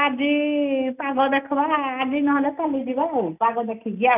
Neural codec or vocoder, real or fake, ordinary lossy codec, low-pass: none; real; none; 3.6 kHz